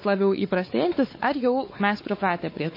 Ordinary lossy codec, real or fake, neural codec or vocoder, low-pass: MP3, 24 kbps; fake; codec, 16 kHz, 4 kbps, X-Codec, WavLM features, trained on Multilingual LibriSpeech; 5.4 kHz